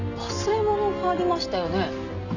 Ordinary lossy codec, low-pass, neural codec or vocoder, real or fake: none; 7.2 kHz; none; real